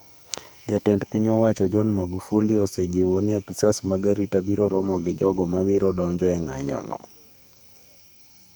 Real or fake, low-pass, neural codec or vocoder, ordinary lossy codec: fake; none; codec, 44.1 kHz, 2.6 kbps, SNAC; none